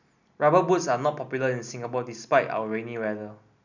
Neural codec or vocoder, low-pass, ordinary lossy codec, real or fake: none; 7.2 kHz; none; real